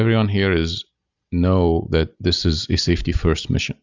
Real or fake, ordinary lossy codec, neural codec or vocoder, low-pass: real; Opus, 64 kbps; none; 7.2 kHz